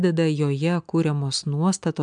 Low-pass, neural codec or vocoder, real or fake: 9.9 kHz; none; real